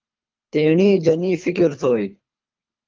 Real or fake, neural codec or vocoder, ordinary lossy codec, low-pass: fake; codec, 24 kHz, 3 kbps, HILCodec; Opus, 32 kbps; 7.2 kHz